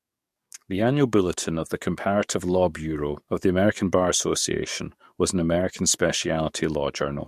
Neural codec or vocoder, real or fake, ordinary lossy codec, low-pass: codec, 44.1 kHz, 7.8 kbps, DAC; fake; MP3, 64 kbps; 14.4 kHz